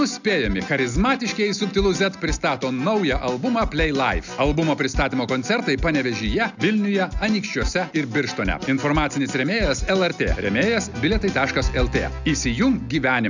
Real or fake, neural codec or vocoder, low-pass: real; none; 7.2 kHz